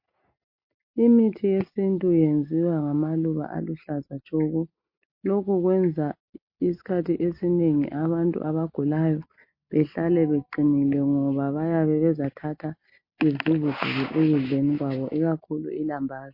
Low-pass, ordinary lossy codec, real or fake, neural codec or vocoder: 5.4 kHz; MP3, 32 kbps; real; none